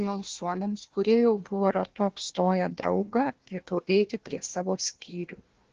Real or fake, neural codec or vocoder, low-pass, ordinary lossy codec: fake; codec, 16 kHz, 1 kbps, FunCodec, trained on Chinese and English, 50 frames a second; 7.2 kHz; Opus, 16 kbps